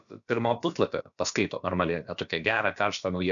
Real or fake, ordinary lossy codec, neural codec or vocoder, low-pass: fake; MP3, 96 kbps; codec, 16 kHz, about 1 kbps, DyCAST, with the encoder's durations; 7.2 kHz